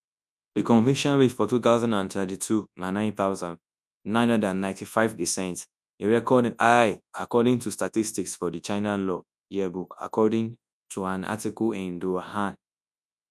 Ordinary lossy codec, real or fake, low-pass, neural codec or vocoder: none; fake; none; codec, 24 kHz, 0.9 kbps, WavTokenizer, large speech release